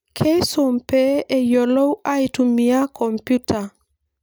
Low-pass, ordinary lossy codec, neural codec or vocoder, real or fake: none; none; none; real